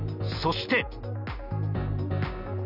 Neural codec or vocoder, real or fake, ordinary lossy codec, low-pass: none; real; none; 5.4 kHz